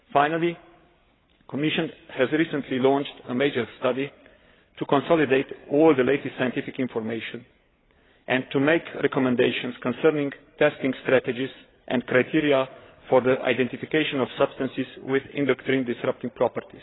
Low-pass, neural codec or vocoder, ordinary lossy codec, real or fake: 7.2 kHz; codec, 16 kHz, 8 kbps, FreqCodec, larger model; AAC, 16 kbps; fake